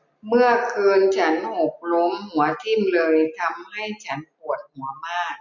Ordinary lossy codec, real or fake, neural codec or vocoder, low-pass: none; real; none; 7.2 kHz